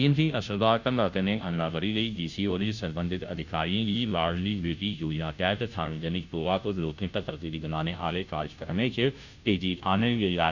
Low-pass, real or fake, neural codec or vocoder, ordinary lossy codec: 7.2 kHz; fake; codec, 16 kHz, 0.5 kbps, FunCodec, trained on Chinese and English, 25 frames a second; none